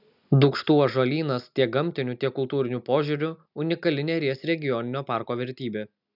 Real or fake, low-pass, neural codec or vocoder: real; 5.4 kHz; none